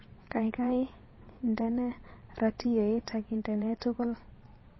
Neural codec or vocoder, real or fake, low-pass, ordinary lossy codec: vocoder, 22.05 kHz, 80 mel bands, Vocos; fake; 7.2 kHz; MP3, 24 kbps